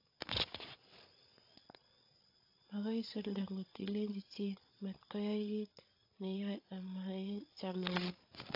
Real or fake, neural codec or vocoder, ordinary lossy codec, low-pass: fake; codec, 16 kHz, 8 kbps, FreqCodec, larger model; AAC, 32 kbps; 5.4 kHz